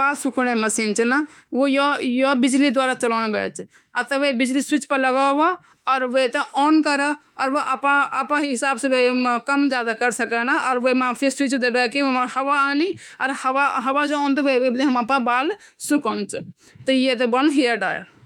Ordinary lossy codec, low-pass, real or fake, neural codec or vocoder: none; 19.8 kHz; fake; autoencoder, 48 kHz, 32 numbers a frame, DAC-VAE, trained on Japanese speech